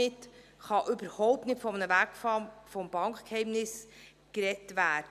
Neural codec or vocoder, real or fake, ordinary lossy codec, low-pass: none; real; none; 14.4 kHz